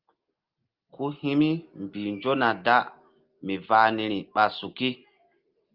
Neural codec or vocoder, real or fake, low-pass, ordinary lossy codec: none; real; 5.4 kHz; Opus, 32 kbps